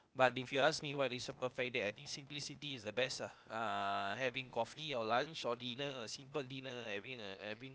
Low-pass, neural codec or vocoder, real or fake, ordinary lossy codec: none; codec, 16 kHz, 0.8 kbps, ZipCodec; fake; none